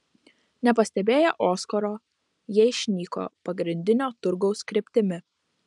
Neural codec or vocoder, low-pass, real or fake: none; 10.8 kHz; real